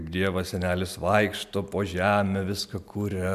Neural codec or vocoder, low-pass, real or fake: none; 14.4 kHz; real